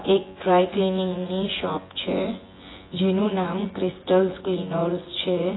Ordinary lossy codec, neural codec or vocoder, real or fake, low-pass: AAC, 16 kbps; vocoder, 24 kHz, 100 mel bands, Vocos; fake; 7.2 kHz